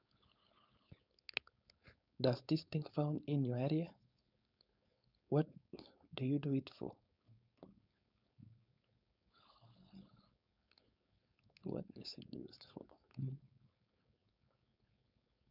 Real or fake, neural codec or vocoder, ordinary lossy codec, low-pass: fake; codec, 16 kHz, 4.8 kbps, FACodec; none; 5.4 kHz